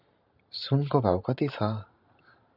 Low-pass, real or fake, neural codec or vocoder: 5.4 kHz; fake; vocoder, 44.1 kHz, 128 mel bands every 512 samples, BigVGAN v2